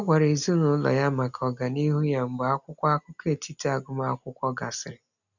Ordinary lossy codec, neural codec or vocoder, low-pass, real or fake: none; none; 7.2 kHz; real